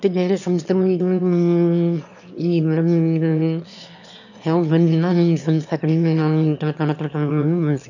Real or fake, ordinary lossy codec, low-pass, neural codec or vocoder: fake; none; 7.2 kHz; autoencoder, 22.05 kHz, a latent of 192 numbers a frame, VITS, trained on one speaker